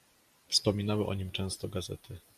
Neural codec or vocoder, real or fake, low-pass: none; real; 14.4 kHz